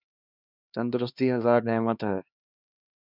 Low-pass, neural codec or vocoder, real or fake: 5.4 kHz; codec, 16 kHz, 2 kbps, X-Codec, HuBERT features, trained on LibriSpeech; fake